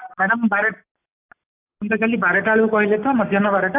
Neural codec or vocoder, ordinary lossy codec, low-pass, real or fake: none; AAC, 24 kbps; 3.6 kHz; real